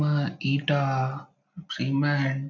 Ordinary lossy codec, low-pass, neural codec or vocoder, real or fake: none; 7.2 kHz; none; real